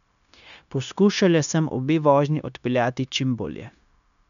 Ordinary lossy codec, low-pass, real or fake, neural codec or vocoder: none; 7.2 kHz; fake; codec, 16 kHz, 0.9 kbps, LongCat-Audio-Codec